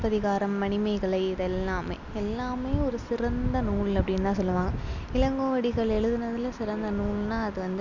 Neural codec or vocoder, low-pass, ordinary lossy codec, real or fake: none; 7.2 kHz; none; real